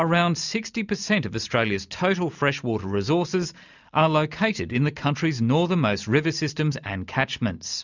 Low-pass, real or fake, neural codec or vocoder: 7.2 kHz; real; none